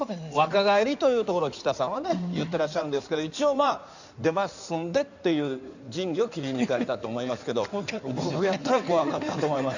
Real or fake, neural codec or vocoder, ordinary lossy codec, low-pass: fake; codec, 16 kHz in and 24 kHz out, 2.2 kbps, FireRedTTS-2 codec; none; 7.2 kHz